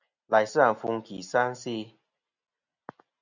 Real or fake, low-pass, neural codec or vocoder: real; 7.2 kHz; none